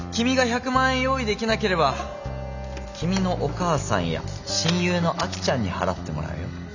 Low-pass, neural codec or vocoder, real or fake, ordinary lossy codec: 7.2 kHz; none; real; none